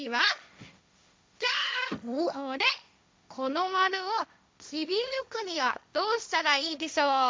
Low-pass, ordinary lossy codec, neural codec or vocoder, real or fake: none; none; codec, 16 kHz, 1.1 kbps, Voila-Tokenizer; fake